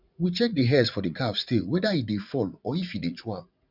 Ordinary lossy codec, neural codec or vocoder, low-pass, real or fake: none; vocoder, 44.1 kHz, 80 mel bands, Vocos; 5.4 kHz; fake